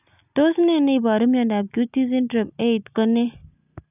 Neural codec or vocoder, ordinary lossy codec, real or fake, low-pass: none; none; real; 3.6 kHz